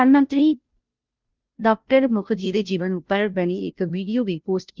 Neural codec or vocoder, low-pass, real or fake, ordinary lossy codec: codec, 16 kHz, 0.5 kbps, FunCodec, trained on Chinese and English, 25 frames a second; 7.2 kHz; fake; Opus, 32 kbps